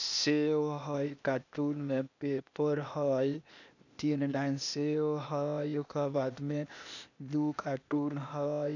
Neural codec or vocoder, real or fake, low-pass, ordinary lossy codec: codec, 16 kHz, 0.8 kbps, ZipCodec; fake; 7.2 kHz; none